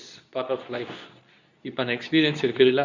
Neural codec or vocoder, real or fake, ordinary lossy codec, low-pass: codec, 24 kHz, 0.9 kbps, WavTokenizer, medium speech release version 2; fake; none; 7.2 kHz